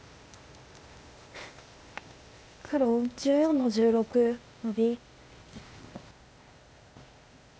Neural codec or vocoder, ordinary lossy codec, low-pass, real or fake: codec, 16 kHz, 0.8 kbps, ZipCodec; none; none; fake